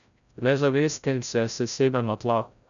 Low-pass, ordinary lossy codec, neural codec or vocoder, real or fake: 7.2 kHz; none; codec, 16 kHz, 0.5 kbps, FreqCodec, larger model; fake